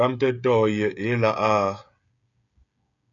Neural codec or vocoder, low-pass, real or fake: codec, 16 kHz, 16 kbps, FreqCodec, smaller model; 7.2 kHz; fake